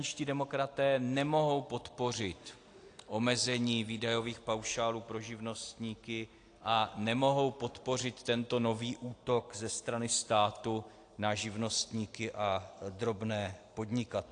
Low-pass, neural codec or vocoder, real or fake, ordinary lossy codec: 9.9 kHz; none; real; AAC, 48 kbps